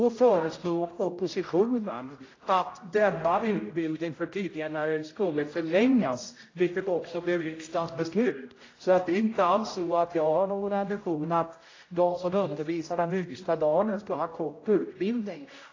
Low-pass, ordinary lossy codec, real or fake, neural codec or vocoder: 7.2 kHz; AAC, 32 kbps; fake; codec, 16 kHz, 0.5 kbps, X-Codec, HuBERT features, trained on general audio